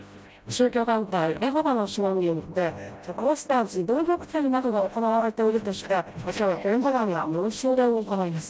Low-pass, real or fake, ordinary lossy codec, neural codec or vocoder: none; fake; none; codec, 16 kHz, 0.5 kbps, FreqCodec, smaller model